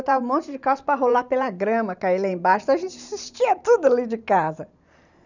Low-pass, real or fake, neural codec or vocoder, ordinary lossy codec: 7.2 kHz; fake; vocoder, 44.1 kHz, 128 mel bands every 512 samples, BigVGAN v2; none